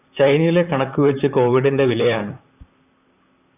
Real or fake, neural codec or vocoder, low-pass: fake; vocoder, 44.1 kHz, 128 mel bands, Pupu-Vocoder; 3.6 kHz